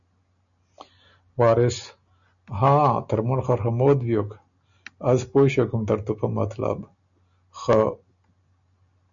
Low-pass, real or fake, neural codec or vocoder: 7.2 kHz; real; none